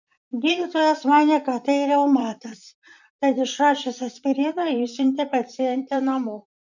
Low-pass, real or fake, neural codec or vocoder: 7.2 kHz; fake; vocoder, 44.1 kHz, 128 mel bands, Pupu-Vocoder